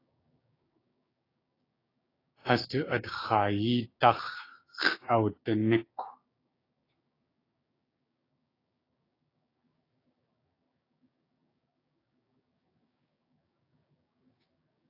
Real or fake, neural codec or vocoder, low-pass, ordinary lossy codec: fake; codec, 16 kHz, 6 kbps, DAC; 5.4 kHz; AAC, 24 kbps